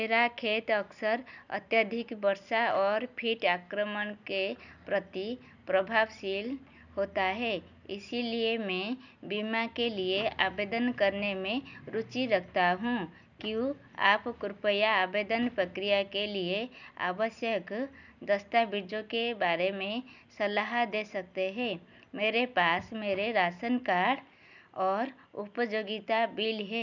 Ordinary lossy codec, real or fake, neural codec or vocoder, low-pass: none; real; none; 7.2 kHz